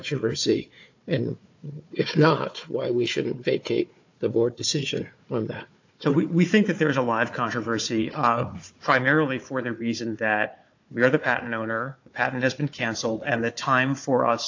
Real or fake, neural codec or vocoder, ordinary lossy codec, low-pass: fake; codec, 16 kHz, 4 kbps, FunCodec, trained on Chinese and English, 50 frames a second; AAC, 48 kbps; 7.2 kHz